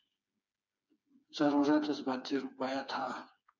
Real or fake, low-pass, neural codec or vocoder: fake; 7.2 kHz; codec, 16 kHz, 4 kbps, FreqCodec, smaller model